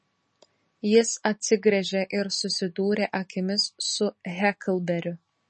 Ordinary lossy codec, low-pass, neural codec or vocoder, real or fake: MP3, 32 kbps; 10.8 kHz; none; real